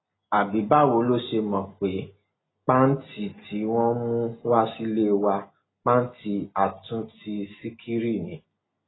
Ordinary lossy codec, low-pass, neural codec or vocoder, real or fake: AAC, 16 kbps; 7.2 kHz; none; real